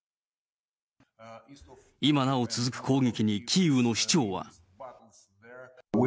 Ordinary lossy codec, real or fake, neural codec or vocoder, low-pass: none; real; none; none